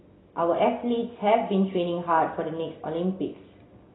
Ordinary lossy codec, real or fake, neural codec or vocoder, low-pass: AAC, 16 kbps; real; none; 7.2 kHz